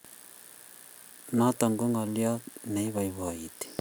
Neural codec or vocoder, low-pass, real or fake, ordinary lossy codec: none; none; real; none